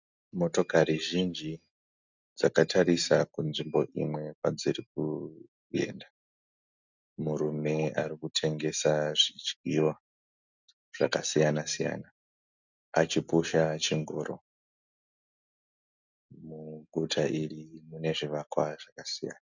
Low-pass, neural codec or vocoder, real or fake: 7.2 kHz; none; real